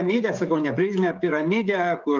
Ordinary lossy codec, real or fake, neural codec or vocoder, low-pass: Opus, 24 kbps; fake; codec, 16 kHz, 16 kbps, FreqCodec, smaller model; 7.2 kHz